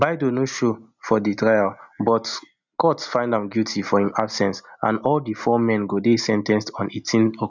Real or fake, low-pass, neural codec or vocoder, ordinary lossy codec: real; 7.2 kHz; none; none